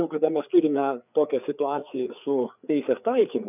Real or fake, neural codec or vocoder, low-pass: fake; codec, 16 kHz, 4 kbps, FreqCodec, larger model; 3.6 kHz